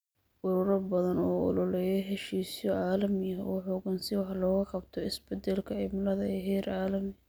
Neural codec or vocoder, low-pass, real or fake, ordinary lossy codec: none; none; real; none